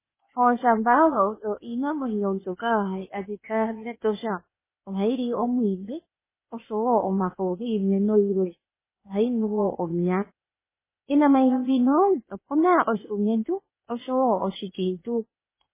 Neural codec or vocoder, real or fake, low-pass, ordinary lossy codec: codec, 16 kHz, 0.8 kbps, ZipCodec; fake; 3.6 kHz; MP3, 16 kbps